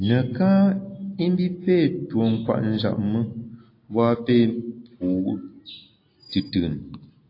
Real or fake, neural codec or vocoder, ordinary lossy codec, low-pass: real; none; AAC, 32 kbps; 5.4 kHz